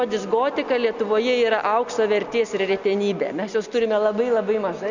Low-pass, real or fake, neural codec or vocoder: 7.2 kHz; real; none